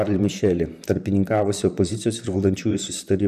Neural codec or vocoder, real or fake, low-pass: vocoder, 44.1 kHz, 128 mel bands, Pupu-Vocoder; fake; 14.4 kHz